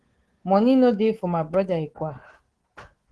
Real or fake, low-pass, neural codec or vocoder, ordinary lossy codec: fake; 10.8 kHz; codec, 24 kHz, 3.1 kbps, DualCodec; Opus, 16 kbps